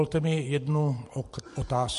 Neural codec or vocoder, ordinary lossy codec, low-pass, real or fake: vocoder, 44.1 kHz, 128 mel bands every 512 samples, BigVGAN v2; MP3, 48 kbps; 14.4 kHz; fake